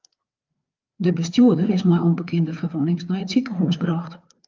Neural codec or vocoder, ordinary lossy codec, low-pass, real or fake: codec, 16 kHz, 4 kbps, FreqCodec, larger model; Opus, 24 kbps; 7.2 kHz; fake